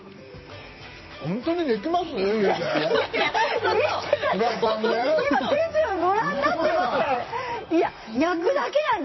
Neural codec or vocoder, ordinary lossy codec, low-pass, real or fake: vocoder, 44.1 kHz, 128 mel bands, Pupu-Vocoder; MP3, 24 kbps; 7.2 kHz; fake